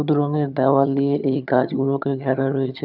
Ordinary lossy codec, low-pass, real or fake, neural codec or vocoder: none; 5.4 kHz; fake; vocoder, 22.05 kHz, 80 mel bands, HiFi-GAN